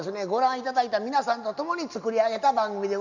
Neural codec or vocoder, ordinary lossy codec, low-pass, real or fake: none; none; 7.2 kHz; real